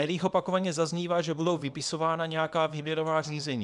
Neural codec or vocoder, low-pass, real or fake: codec, 24 kHz, 0.9 kbps, WavTokenizer, small release; 10.8 kHz; fake